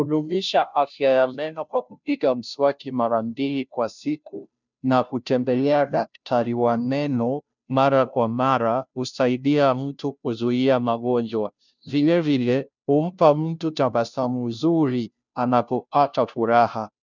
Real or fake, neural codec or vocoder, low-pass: fake; codec, 16 kHz, 0.5 kbps, FunCodec, trained on Chinese and English, 25 frames a second; 7.2 kHz